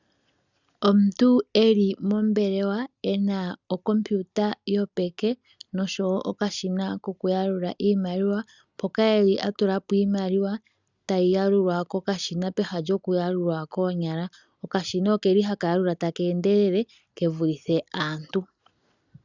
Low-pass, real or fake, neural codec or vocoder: 7.2 kHz; real; none